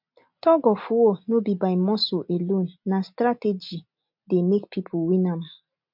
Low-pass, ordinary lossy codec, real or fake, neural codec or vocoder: 5.4 kHz; MP3, 48 kbps; real; none